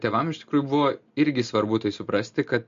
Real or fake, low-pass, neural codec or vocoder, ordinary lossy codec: real; 7.2 kHz; none; MP3, 48 kbps